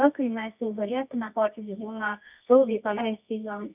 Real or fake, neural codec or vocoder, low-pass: fake; codec, 24 kHz, 0.9 kbps, WavTokenizer, medium music audio release; 3.6 kHz